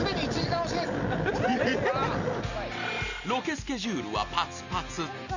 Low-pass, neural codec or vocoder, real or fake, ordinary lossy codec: 7.2 kHz; none; real; none